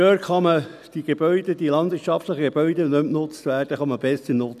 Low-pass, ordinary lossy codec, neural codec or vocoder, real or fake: 14.4 kHz; none; none; real